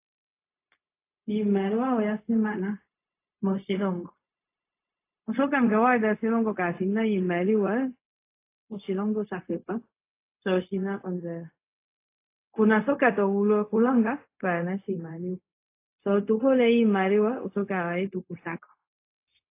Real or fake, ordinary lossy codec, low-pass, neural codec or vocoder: fake; AAC, 24 kbps; 3.6 kHz; codec, 16 kHz, 0.4 kbps, LongCat-Audio-Codec